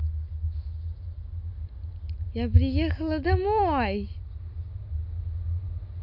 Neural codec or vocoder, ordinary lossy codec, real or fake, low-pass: none; none; real; 5.4 kHz